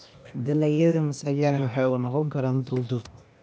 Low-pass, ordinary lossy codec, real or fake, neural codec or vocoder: none; none; fake; codec, 16 kHz, 0.8 kbps, ZipCodec